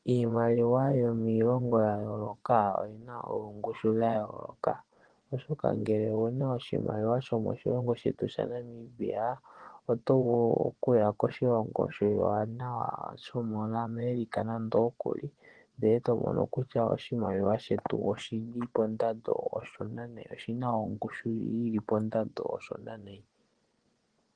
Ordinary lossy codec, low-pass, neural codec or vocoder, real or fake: Opus, 16 kbps; 9.9 kHz; vocoder, 24 kHz, 100 mel bands, Vocos; fake